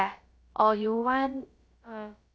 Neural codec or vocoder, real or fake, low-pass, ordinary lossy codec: codec, 16 kHz, about 1 kbps, DyCAST, with the encoder's durations; fake; none; none